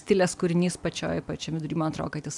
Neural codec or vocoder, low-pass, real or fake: none; 10.8 kHz; real